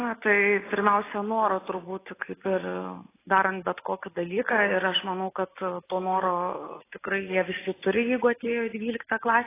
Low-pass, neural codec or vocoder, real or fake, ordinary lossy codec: 3.6 kHz; none; real; AAC, 16 kbps